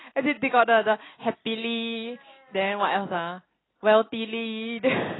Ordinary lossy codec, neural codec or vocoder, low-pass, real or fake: AAC, 16 kbps; none; 7.2 kHz; real